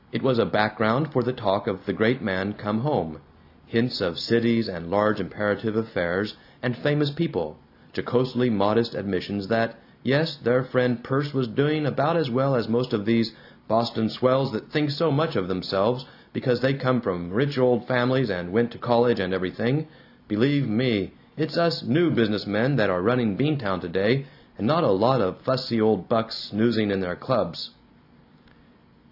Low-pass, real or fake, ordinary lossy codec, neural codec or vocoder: 5.4 kHz; real; AAC, 48 kbps; none